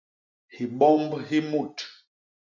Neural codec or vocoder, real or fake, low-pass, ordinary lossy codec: none; real; 7.2 kHz; MP3, 64 kbps